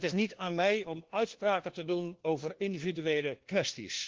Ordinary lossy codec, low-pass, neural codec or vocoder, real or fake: Opus, 16 kbps; 7.2 kHz; codec, 16 kHz, 1 kbps, FunCodec, trained on LibriTTS, 50 frames a second; fake